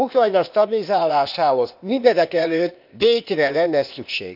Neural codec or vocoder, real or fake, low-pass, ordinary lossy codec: codec, 16 kHz, 0.8 kbps, ZipCodec; fake; 5.4 kHz; none